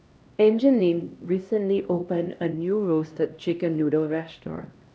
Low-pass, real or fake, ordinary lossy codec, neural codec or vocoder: none; fake; none; codec, 16 kHz, 1 kbps, X-Codec, HuBERT features, trained on LibriSpeech